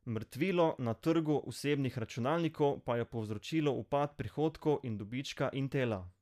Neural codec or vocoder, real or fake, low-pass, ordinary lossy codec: none; real; 14.4 kHz; none